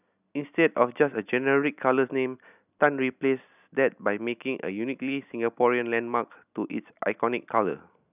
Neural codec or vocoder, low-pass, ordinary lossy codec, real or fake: none; 3.6 kHz; none; real